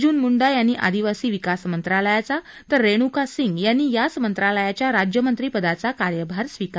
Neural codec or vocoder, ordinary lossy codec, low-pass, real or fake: none; none; none; real